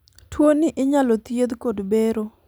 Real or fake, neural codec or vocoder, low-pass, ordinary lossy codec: real; none; none; none